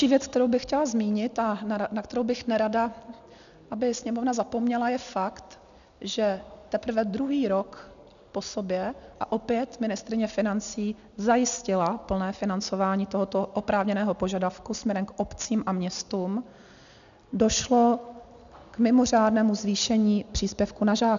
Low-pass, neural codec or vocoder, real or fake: 7.2 kHz; none; real